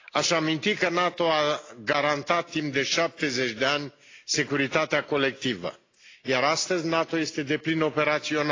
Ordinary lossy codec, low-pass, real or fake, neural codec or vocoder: AAC, 32 kbps; 7.2 kHz; real; none